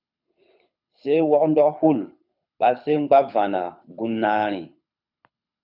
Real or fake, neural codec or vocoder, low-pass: fake; codec, 24 kHz, 6 kbps, HILCodec; 5.4 kHz